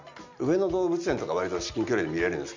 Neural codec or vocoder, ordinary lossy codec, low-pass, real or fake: none; MP3, 64 kbps; 7.2 kHz; real